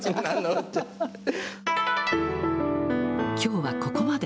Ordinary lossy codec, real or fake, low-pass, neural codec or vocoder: none; real; none; none